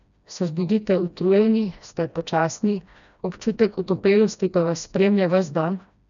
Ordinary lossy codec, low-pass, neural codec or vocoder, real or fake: none; 7.2 kHz; codec, 16 kHz, 1 kbps, FreqCodec, smaller model; fake